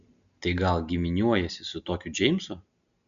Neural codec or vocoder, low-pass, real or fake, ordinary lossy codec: none; 7.2 kHz; real; MP3, 96 kbps